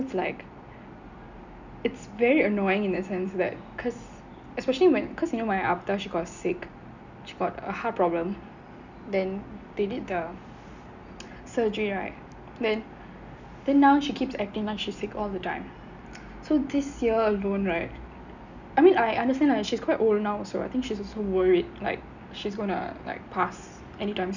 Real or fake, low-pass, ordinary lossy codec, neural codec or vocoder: real; 7.2 kHz; none; none